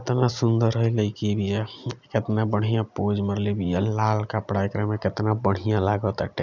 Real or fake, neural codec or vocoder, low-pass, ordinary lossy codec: real; none; 7.2 kHz; none